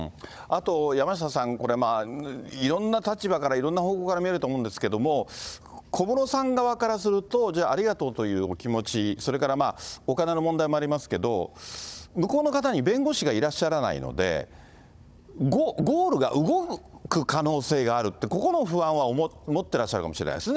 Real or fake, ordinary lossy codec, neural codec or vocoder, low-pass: fake; none; codec, 16 kHz, 16 kbps, FunCodec, trained on Chinese and English, 50 frames a second; none